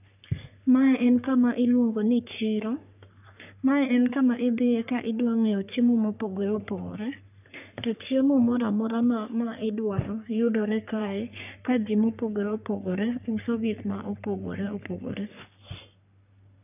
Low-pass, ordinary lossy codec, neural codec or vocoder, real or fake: 3.6 kHz; none; codec, 44.1 kHz, 3.4 kbps, Pupu-Codec; fake